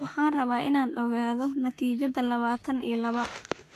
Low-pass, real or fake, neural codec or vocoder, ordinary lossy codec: 14.4 kHz; fake; autoencoder, 48 kHz, 32 numbers a frame, DAC-VAE, trained on Japanese speech; AAC, 64 kbps